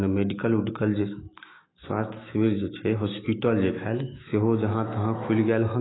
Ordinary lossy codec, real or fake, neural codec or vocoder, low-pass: AAC, 16 kbps; fake; autoencoder, 48 kHz, 128 numbers a frame, DAC-VAE, trained on Japanese speech; 7.2 kHz